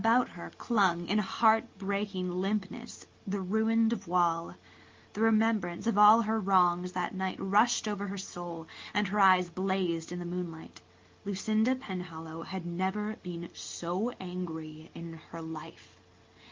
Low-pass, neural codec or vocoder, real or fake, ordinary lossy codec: 7.2 kHz; none; real; Opus, 32 kbps